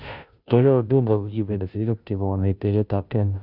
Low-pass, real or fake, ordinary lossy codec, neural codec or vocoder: 5.4 kHz; fake; none; codec, 16 kHz, 0.5 kbps, FunCodec, trained on Chinese and English, 25 frames a second